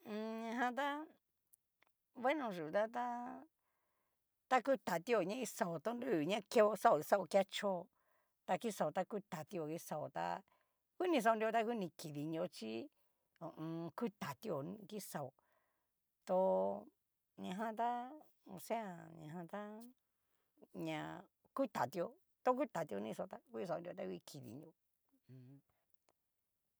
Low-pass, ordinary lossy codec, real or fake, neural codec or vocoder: none; none; real; none